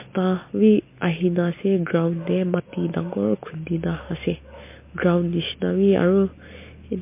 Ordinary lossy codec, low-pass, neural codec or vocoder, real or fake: MP3, 24 kbps; 3.6 kHz; none; real